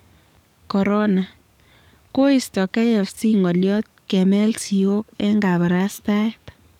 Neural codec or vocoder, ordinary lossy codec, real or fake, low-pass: codec, 44.1 kHz, 7.8 kbps, DAC; none; fake; 19.8 kHz